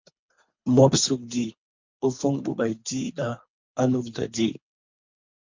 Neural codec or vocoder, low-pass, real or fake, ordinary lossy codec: codec, 24 kHz, 3 kbps, HILCodec; 7.2 kHz; fake; MP3, 64 kbps